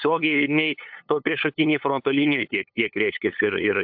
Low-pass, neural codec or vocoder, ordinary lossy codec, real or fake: 5.4 kHz; codec, 16 kHz, 8 kbps, FunCodec, trained on LibriTTS, 25 frames a second; AAC, 48 kbps; fake